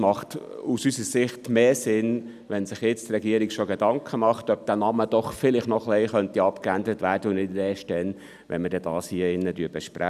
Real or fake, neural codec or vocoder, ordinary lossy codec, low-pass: real; none; none; 14.4 kHz